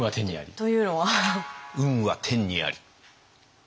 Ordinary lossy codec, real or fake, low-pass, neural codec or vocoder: none; real; none; none